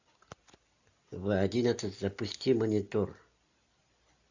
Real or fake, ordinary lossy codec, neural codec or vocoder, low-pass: real; AAC, 48 kbps; none; 7.2 kHz